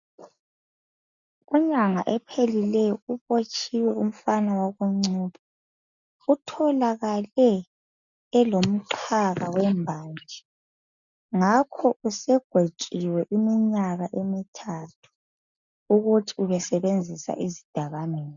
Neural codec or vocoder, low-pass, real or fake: none; 7.2 kHz; real